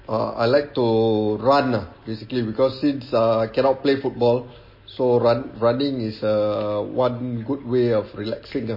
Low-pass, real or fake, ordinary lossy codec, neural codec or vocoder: 5.4 kHz; real; MP3, 24 kbps; none